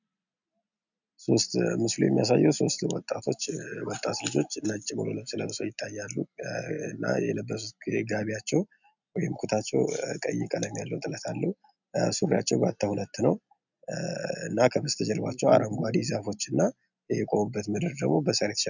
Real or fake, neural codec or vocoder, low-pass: real; none; 7.2 kHz